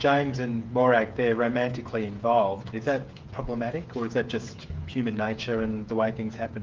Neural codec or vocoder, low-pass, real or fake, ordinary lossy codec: codec, 16 kHz, 8 kbps, FreqCodec, smaller model; 7.2 kHz; fake; Opus, 24 kbps